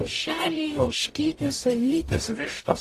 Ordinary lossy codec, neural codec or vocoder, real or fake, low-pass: AAC, 48 kbps; codec, 44.1 kHz, 0.9 kbps, DAC; fake; 14.4 kHz